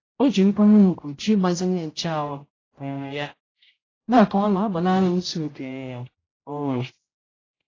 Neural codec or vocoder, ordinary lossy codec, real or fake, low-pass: codec, 16 kHz, 0.5 kbps, X-Codec, HuBERT features, trained on general audio; AAC, 32 kbps; fake; 7.2 kHz